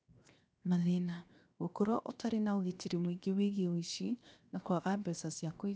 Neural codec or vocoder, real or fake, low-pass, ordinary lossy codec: codec, 16 kHz, 0.7 kbps, FocalCodec; fake; none; none